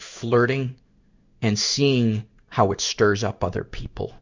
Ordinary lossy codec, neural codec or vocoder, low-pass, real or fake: Opus, 64 kbps; codec, 16 kHz in and 24 kHz out, 1 kbps, XY-Tokenizer; 7.2 kHz; fake